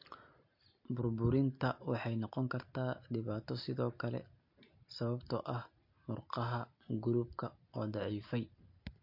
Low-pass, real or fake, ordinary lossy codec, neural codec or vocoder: 5.4 kHz; real; MP3, 32 kbps; none